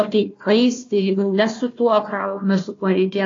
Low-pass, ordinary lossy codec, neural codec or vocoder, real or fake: 7.2 kHz; AAC, 32 kbps; codec, 16 kHz, 1 kbps, FunCodec, trained on Chinese and English, 50 frames a second; fake